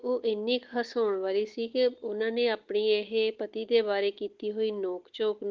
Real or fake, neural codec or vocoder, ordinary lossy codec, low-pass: real; none; Opus, 16 kbps; 7.2 kHz